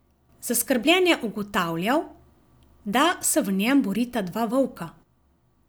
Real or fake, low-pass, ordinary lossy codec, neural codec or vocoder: real; none; none; none